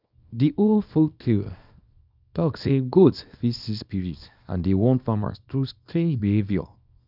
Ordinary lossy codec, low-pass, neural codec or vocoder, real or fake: none; 5.4 kHz; codec, 24 kHz, 0.9 kbps, WavTokenizer, small release; fake